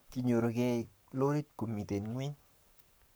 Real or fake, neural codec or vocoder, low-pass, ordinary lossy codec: fake; codec, 44.1 kHz, 7.8 kbps, Pupu-Codec; none; none